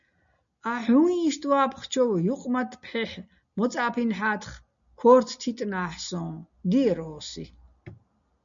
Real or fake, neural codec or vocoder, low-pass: real; none; 7.2 kHz